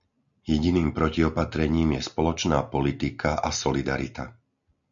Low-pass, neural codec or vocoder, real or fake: 7.2 kHz; none; real